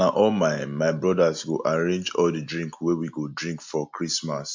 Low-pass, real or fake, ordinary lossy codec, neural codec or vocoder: 7.2 kHz; real; MP3, 48 kbps; none